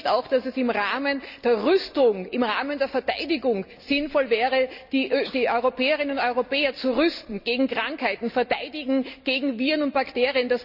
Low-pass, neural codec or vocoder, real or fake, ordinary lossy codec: 5.4 kHz; none; real; none